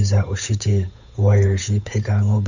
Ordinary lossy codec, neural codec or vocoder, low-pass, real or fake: none; codec, 16 kHz, 8 kbps, FunCodec, trained on Chinese and English, 25 frames a second; 7.2 kHz; fake